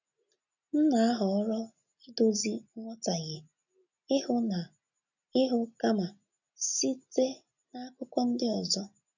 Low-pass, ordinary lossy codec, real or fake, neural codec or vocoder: 7.2 kHz; none; real; none